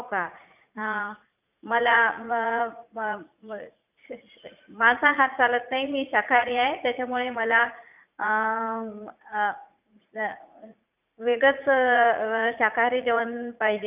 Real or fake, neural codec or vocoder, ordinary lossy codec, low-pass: fake; vocoder, 44.1 kHz, 80 mel bands, Vocos; none; 3.6 kHz